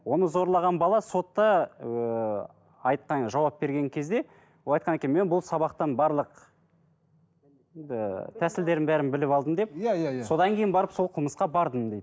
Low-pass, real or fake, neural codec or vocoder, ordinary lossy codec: none; real; none; none